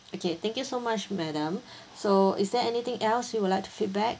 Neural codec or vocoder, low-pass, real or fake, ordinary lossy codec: none; none; real; none